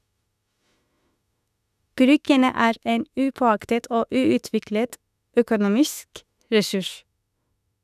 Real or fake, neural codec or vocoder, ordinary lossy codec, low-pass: fake; autoencoder, 48 kHz, 32 numbers a frame, DAC-VAE, trained on Japanese speech; none; 14.4 kHz